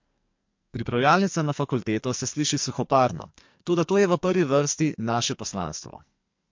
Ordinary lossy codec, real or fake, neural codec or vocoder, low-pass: MP3, 48 kbps; fake; codec, 44.1 kHz, 2.6 kbps, SNAC; 7.2 kHz